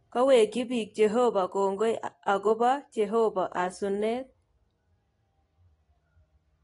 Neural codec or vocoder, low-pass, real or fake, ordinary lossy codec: none; 10.8 kHz; real; AAC, 32 kbps